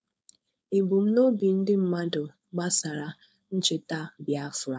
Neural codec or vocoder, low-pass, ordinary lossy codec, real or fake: codec, 16 kHz, 4.8 kbps, FACodec; none; none; fake